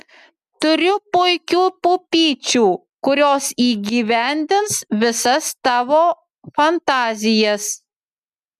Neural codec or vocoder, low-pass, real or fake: none; 14.4 kHz; real